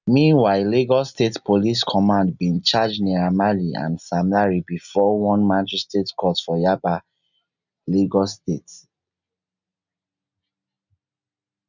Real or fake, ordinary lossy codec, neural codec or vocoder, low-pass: real; none; none; 7.2 kHz